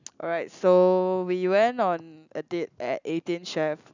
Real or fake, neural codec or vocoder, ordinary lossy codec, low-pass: real; none; none; 7.2 kHz